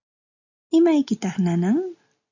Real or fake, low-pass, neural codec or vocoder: real; 7.2 kHz; none